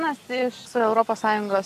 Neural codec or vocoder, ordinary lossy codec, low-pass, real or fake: none; AAC, 64 kbps; 14.4 kHz; real